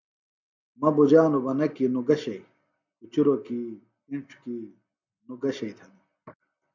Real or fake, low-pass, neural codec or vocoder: real; 7.2 kHz; none